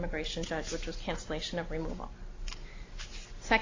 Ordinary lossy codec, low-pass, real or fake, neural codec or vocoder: AAC, 32 kbps; 7.2 kHz; real; none